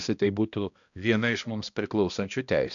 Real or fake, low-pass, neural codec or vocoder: fake; 7.2 kHz; codec, 16 kHz, 1 kbps, X-Codec, HuBERT features, trained on balanced general audio